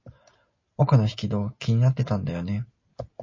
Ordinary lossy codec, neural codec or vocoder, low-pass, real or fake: MP3, 32 kbps; none; 7.2 kHz; real